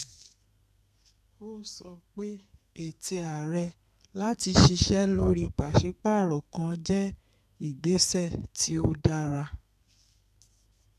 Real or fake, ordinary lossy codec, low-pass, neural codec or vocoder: fake; none; 14.4 kHz; codec, 32 kHz, 1.9 kbps, SNAC